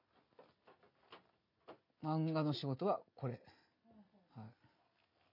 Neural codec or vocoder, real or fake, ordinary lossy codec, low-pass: none; real; none; 5.4 kHz